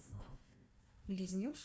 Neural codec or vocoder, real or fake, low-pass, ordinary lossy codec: codec, 16 kHz, 1 kbps, FunCodec, trained on Chinese and English, 50 frames a second; fake; none; none